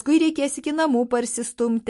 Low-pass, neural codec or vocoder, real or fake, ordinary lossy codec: 14.4 kHz; none; real; MP3, 48 kbps